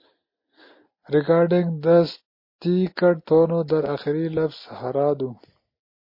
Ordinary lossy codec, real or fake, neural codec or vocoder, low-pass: MP3, 24 kbps; real; none; 5.4 kHz